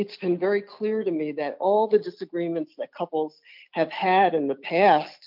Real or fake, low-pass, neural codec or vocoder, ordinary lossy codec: real; 5.4 kHz; none; MP3, 48 kbps